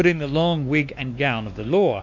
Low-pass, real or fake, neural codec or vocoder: 7.2 kHz; fake; codec, 16 kHz, 2 kbps, X-Codec, WavLM features, trained on Multilingual LibriSpeech